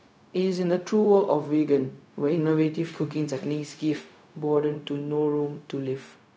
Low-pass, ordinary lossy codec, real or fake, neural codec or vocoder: none; none; fake; codec, 16 kHz, 0.4 kbps, LongCat-Audio-Codec